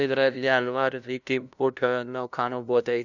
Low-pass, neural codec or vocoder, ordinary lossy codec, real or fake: 7.2 kHz; codec, 16 kHz, 0.5 kbps, FunCodec, trained on LibriTTS, 25 frames a second; none; fake